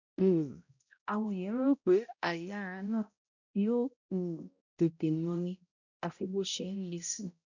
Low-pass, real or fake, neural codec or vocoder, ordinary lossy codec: 7.2 kHz; fake; codec, 16 kHz, 0.5 kbps, X-Codec, HuBERT features, trained on balanced general audio; none